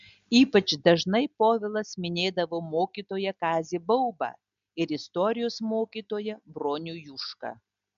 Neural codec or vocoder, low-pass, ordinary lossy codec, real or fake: none; 7.2 kHz; MP3, 64 kbps; real